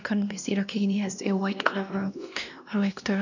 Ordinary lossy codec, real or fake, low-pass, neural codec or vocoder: none; fake; 7.2 kHz; codec, 16 kHz, 1 kbps, X-Codec, WavLM features, trained on Multilingual LibriSpeech